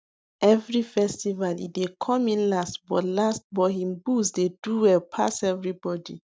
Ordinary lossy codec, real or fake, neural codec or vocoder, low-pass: none; real; none; none